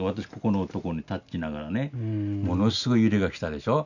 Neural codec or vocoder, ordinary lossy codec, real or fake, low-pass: none; none; real; 7.2 kHz